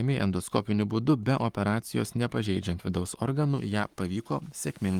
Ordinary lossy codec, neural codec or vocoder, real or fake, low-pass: Opus, 32 kbps; codec, 44.1 kHz, 7.8 kbps, Pupu-Codec; fake; 19.8 kHz